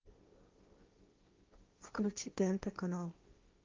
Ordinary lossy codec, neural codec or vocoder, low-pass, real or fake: Opus, 24 kbps; codec, 16 kHz, 1.1 kbps, Voila-Tokenizer; 7.2 kHz; fake